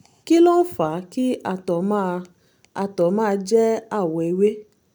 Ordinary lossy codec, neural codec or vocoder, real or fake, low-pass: none; none; real; none